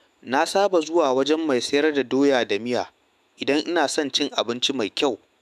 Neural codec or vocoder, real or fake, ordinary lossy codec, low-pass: autoencoder, 48 kHz, 128 numbers a frame, DAC-VAE, trained on Japanese speech; fake; none; 14.4 kHz